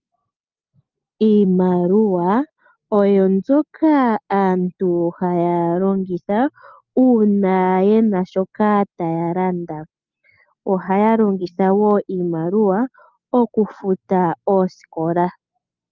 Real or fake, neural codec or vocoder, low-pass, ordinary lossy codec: real; none; 7.2 kHz; Opus, 32 kbps